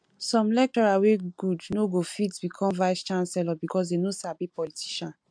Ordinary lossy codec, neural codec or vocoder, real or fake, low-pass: MP3, 64 kbps; none; real; 9.9 kHz